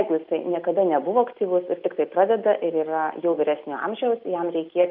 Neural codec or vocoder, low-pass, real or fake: none; 5.4 kHz; real